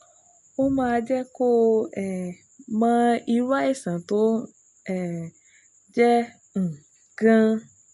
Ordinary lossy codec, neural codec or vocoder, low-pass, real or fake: MP3, 48 kbps; none; 14.4 kHz; real